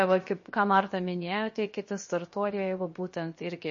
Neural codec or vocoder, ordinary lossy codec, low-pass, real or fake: codec, 16 kHz, 0.7 kbps, FocalCodec; MP3, 32 kbps; 7.2 kHz; fake